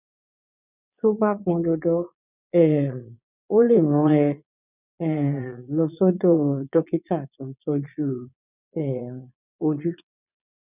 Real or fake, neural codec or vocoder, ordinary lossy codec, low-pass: fake; vocoder, 22.05 kHz, 80 mel bands, Vocos; none; 3.6 kHz